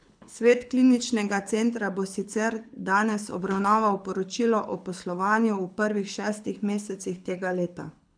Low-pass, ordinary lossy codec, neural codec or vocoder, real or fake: 9.9 kHz; none; codec, 24 kHz, 6 kbps, HILCodec; fake